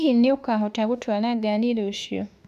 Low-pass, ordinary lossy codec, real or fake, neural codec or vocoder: 14.4 kHz; none; fake; autoencoder, 48 kHz, 32 numbers a frame, DAC-VAE, trained on Japanese speech